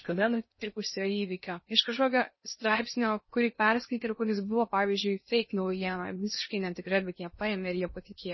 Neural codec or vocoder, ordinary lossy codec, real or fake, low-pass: codec, 16 kHz in and 24 kHz out, 0.6 kbps, FocalCodec, streaming, 2048 codes; MP3, 24 kbps; fake; 7.2 kHz